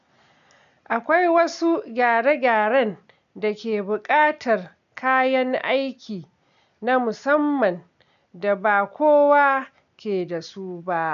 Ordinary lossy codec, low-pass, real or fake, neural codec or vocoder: none; 7.2 kHz; real; none